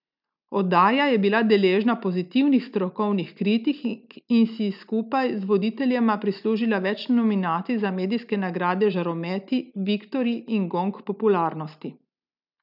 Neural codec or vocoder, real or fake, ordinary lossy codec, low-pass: none; real; none; 5.4 kHz